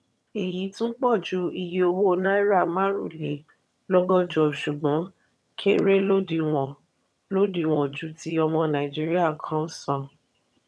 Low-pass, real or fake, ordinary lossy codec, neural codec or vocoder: none; fake; none; vocoder, 22.05 kHz, 80 mel bands, HiFi-GAN